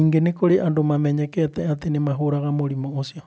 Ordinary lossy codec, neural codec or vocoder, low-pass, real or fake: none; none; none; real